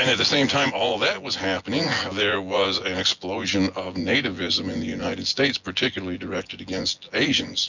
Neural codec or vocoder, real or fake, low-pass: vocoder, 24 kHz, 100 mel bands, Vocos; fake; 7.2 kHz